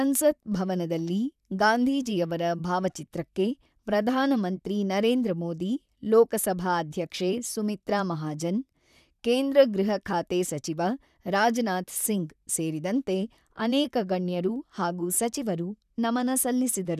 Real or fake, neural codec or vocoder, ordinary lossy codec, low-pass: fake; vocoder, 44.1 kHz, 128 mel bands, Pupu-Vocoder; none; 14.4 kHz